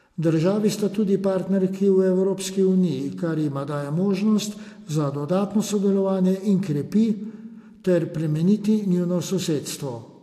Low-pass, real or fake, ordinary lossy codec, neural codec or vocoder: 14.4 kHz; real; AAC, 64 kbps; none